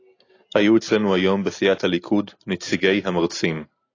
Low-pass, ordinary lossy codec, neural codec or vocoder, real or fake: 7.2 kHz; AAC, 32 kbps; none; real